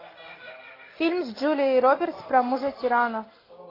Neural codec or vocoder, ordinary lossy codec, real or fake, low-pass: none; AAC, 24 kbps; real; 5.4 kHz